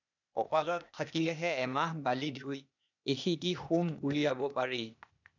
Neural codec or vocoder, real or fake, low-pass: codec, 16 kHz, 0.8 kbps, ZipCodec; fake; 7.2 kHz